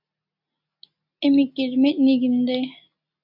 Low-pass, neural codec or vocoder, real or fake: 5.4 kHz; none; real